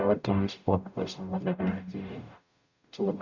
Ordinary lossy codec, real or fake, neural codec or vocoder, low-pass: none; fake; codec, 44.1 kHz, 0.9 kbps, DAC; 7.2 kHz